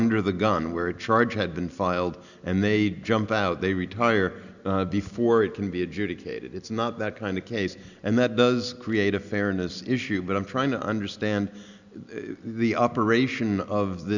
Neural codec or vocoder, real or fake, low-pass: none; real; 7.2 kHz